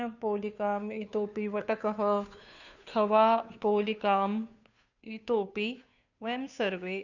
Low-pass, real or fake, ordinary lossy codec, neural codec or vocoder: 7.2 kHz; fake; none; codec, 16 kHz, 2 kbps, FunCodec, trained on Chinese and English, 25 frames a second